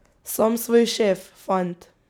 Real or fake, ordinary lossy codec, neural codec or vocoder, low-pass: real; none; none; none